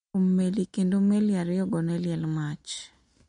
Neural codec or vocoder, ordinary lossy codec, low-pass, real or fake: none; MP3, 48 kbps; 9.9 kHz; real